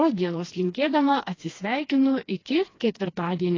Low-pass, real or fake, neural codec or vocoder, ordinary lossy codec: 7.2 kHz; fake; codec, 16 kHz, 2 kbps, FreqCodec, smaller model; AAC, 32 kbps